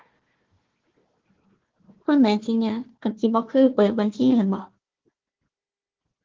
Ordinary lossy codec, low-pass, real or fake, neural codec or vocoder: Opus, 16 kbps; 7.2 kHz; fake; codec, 16 kHz, 1 kbps, FunCodec, trained on Chinese and English, 50 frames a second